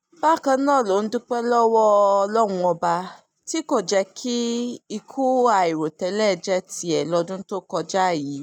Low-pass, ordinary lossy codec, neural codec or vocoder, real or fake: 19.8 kHz; none; none; real